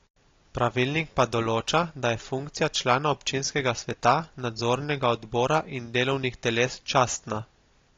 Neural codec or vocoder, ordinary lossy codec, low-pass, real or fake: none; AAC, 32 kbps; 7.2 kHz; real